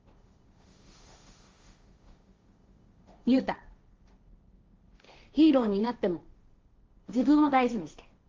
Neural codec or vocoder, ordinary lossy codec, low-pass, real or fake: codec, 16 kHz, 1.1 kbps, Voila-Tokenizer; Opus, 32 kbps; 7.2 kHz; fake